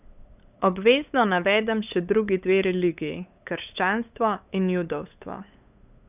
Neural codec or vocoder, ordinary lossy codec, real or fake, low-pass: codec, 16 kHz, 8 kbps, FunCodec, trained on LibriTTS, 25 frames a second; none; fake; 3.6 kHz